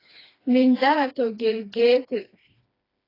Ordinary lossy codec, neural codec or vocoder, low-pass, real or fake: AAC, 24 kbps; codec, 16 kHz, 2 kbps, FreqCodec, smaller model; 5.4 kHz; fake